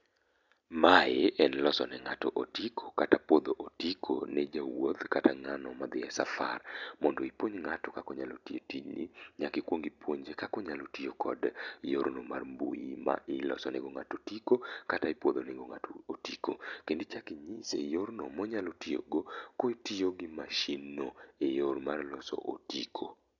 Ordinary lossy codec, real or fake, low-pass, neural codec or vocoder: none; real; 7.2 kHz; none